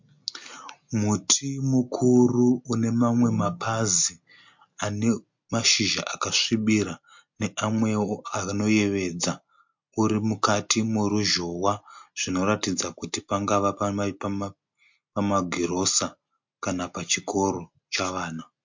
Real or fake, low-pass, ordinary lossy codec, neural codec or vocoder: real; 7.2 kHz; MP3, 48 kbps; none